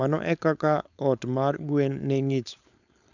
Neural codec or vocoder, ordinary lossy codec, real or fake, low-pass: codec, 16 kHz, 4.8 kbps, FACodec; none; fake; 7.2 kHz